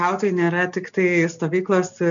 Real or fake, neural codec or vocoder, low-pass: real; none; 7.2 kHz